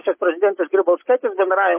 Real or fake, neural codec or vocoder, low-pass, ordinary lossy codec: real; none; 3.6 kHz; MP3, 24 kbps